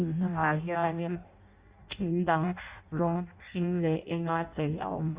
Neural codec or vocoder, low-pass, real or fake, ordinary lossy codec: codec, 16 kHz in and 24 kHz out, 0.6 kbps, FireRedTTS-2 codec; 3.6 kHz; fake; Opus, 64 kbps